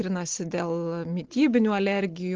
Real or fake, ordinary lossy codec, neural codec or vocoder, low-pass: real; Opus, 16 kbps; none; 7.2 kHz